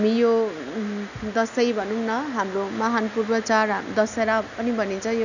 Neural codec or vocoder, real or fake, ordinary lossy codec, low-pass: none; real; none; 7.2 kHz